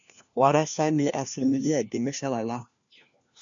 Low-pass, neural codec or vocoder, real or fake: 7.2 kHz; codec, 16 kHz, 1 kbps, FunCodec, trained on LibriTTS, 50 frames a second; fake